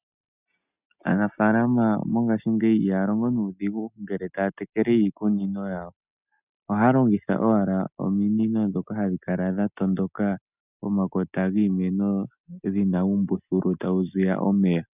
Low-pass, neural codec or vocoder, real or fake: 3.6 kHz; none; real